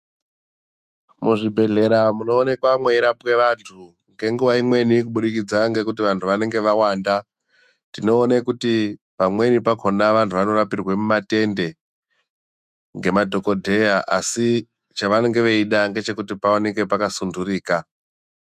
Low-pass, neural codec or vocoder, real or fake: 14.4 kHz; autoencoder, 48 kHz, 128 numbers a frame, DAC-VAE, trained on Japanese speech; fake